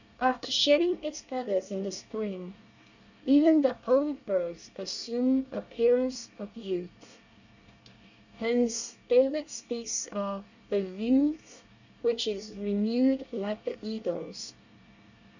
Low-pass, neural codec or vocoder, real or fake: 7.2 kHz; codec, 24 kHz, 1 kbps, SNAC; fake